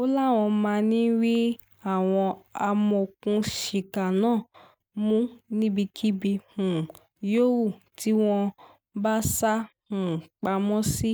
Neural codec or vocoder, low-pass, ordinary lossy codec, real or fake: none; none; none; real